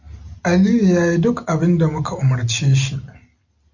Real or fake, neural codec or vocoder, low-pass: real; none; 7.2 kHz